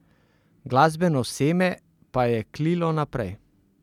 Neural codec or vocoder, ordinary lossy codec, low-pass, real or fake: none; none; 19.8 kHz; real